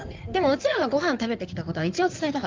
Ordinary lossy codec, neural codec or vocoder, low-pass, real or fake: Opus, 32 kbps; codec, 16 kHz in and 24 kHz out, 2.2 kbps, FireRedTTS-2 codec; 7.2 kHz; fake